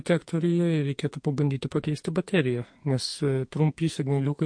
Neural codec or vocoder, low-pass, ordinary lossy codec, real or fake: codec, 44.1 kHz, 2.6 kbps, SNAC; 9.9 kHz; MP3, 48 kbps; fake